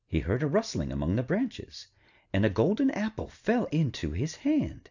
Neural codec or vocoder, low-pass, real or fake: none; 7.2 kHz; real